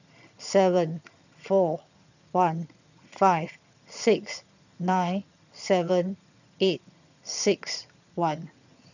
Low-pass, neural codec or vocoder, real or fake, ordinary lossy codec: 7.2 kHz; vocoder, 22.05 kHz, 80 mel bands, HiFi-GAN; fake; none